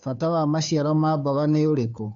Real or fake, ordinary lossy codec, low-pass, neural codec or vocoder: fake; MP3, 64 kbps; 7.2 kHz; codec, 16 kHz, 2 kbps, FunCodec, trained on Chinese and English, 25 frames a second